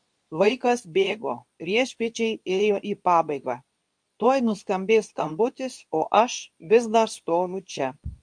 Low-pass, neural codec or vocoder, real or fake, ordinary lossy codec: 9.9 kHz; codec, 24 kHz, 0.9 kbps, WavTokenizer, medium speech release version 2; fake; MP3, 64 kbps